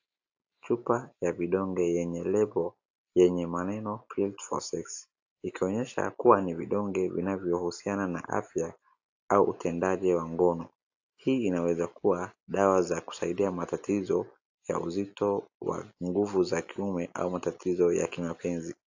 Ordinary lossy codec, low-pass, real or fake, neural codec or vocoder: AAC, 48 kbps; 7.2 kHz; real; none